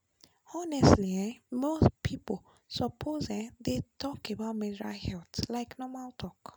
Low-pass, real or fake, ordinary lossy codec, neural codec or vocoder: none; real; none; none